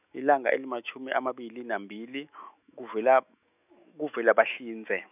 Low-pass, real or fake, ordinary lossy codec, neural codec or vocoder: 3.6 kHz; fake; none; autoencoder, 48 kHz, 128 numbers a frame, DAC-VAE, trained on Japanese speech